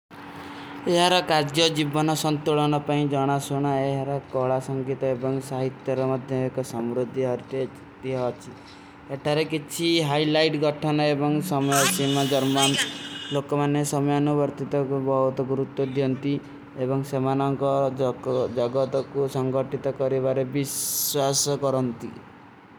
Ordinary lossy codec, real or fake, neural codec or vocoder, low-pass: none; real; none; none